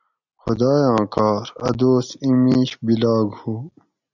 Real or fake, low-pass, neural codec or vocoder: real; 7.2 kHz; none